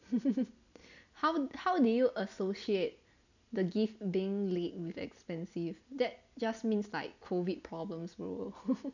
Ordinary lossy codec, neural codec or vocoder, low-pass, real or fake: none; none; 7.2 kHz; real